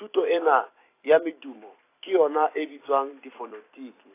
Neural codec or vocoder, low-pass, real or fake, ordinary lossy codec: vocoder, 44.1 kHz, 128 mel bands every 256 samples, BigVGAN v2; 3.6 kHz; fake; AAC, 24 kbps